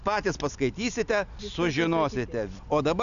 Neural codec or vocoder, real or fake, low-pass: none; real; 7.2 kHz